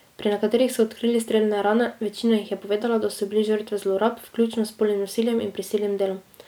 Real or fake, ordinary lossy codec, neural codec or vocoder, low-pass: real; none; none; none